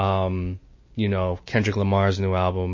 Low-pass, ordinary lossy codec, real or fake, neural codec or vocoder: 7.2 kHz; MP3, 32 kbps; real; none